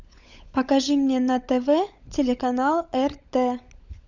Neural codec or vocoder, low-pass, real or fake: codec, 16 kHz, 16 kbps, FunCodec, trained on LibriTTS, 50 frames a second; 7.2 kHz; fake